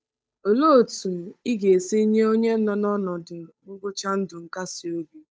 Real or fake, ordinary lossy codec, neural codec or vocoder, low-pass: fake; none; codec, 16 kHz, 8 kbps, FunCodec, trained on Chinese and English, 25 frames a second; none